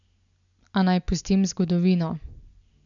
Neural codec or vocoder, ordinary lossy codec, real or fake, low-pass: none; none; real; 7.2 kHz